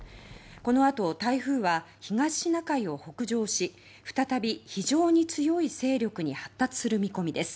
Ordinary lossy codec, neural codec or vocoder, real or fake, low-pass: none; none; real; none